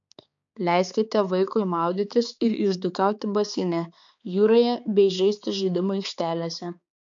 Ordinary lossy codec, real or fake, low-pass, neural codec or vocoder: MP3, 64 kbps; fake; 7.2 kHz; codec, 16 kHz, 4 kbps, X-Codec, HuBERT features, trained on balanced general audio